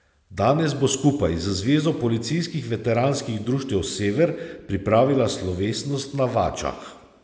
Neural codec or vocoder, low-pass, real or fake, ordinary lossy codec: none; none; real; none